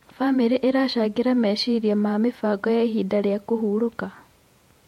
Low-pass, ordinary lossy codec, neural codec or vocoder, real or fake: 19.8 kHz; MP3, 64 kbps; vocoder, 48 kHz, 128 mel bands, Vocos; fake